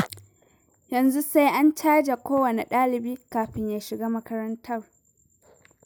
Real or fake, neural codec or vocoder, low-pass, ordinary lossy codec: real; none; none; none